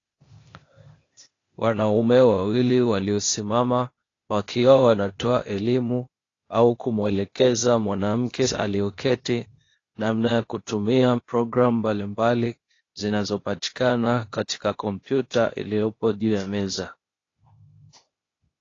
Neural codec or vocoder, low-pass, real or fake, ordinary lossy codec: codec, 16 kHz, 0.8 kbps, ZipCodec; 7.2 kHz; fake; AAC, 32 kbps